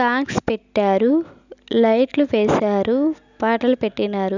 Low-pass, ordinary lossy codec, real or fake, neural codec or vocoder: 7.2 kHz; none; real; none